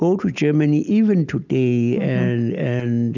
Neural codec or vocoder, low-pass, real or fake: none; 7.2 kHz; real